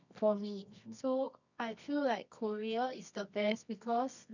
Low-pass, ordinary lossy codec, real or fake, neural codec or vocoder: 7.2 kHz; none; fake; codec, 24 kHz, 0.9 kbps, WavTokenizer, medium music audio release